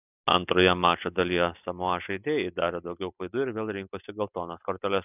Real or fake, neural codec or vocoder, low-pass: real; none; 3.6 kHz